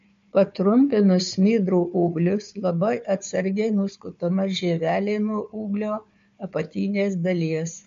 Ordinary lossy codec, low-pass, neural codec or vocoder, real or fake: MP3, 48 kbps; 7.2 kHz; codec, 16 kHz, 4 kbps, FunCodec, trained on Chinese and English, 50 frames a second; fake